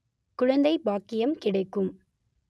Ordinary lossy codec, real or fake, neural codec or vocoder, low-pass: none; real; none; none